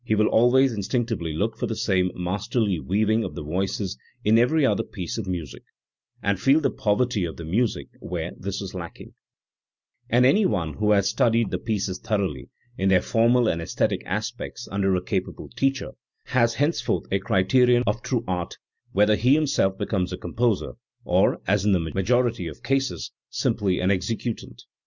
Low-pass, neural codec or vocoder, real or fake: 7.2 kHz; none; real